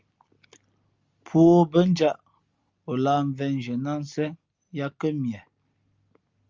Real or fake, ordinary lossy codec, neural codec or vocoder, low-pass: real; Opus, 32 kbps; none; 7.2 kHz